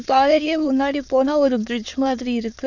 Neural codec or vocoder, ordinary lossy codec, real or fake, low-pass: autoencoder, 22.05 kHz, a latent of 192 numbers a frame, VITS, trained on many speakers; none; fake; 7.2 kHz